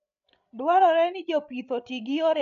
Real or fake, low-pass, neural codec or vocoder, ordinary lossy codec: fake; 7.2 kHz; codec, 16 kHz, 16 kbps, FreqCodec, larger model; none